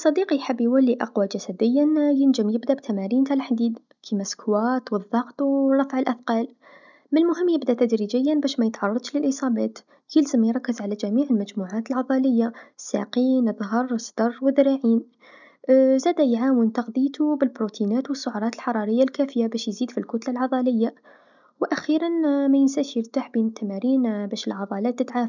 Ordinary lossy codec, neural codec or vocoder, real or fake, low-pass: none; none; real; 7.2 kHz